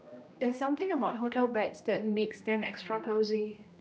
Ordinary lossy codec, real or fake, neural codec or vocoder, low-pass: none; fake; codec, 16 kHz, 1 kbps, X-Codec, HuBERT features, trained on general audio; none